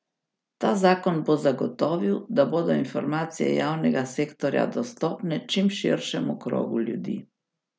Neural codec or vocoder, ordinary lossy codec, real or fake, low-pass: none; none; real; none